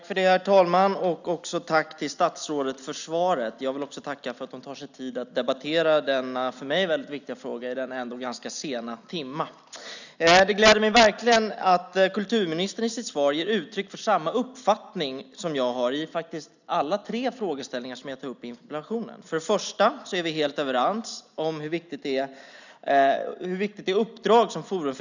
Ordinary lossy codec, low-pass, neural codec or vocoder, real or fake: none; 7.2 kHz; none; real